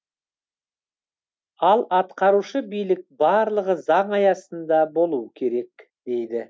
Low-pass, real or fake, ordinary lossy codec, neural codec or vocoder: none; real; none; none